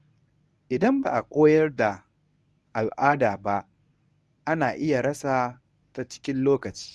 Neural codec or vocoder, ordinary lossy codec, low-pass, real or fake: codec, 24 kHz, 0.9 kbps, WavTokenizer, medium speech release version 1; none; none; fake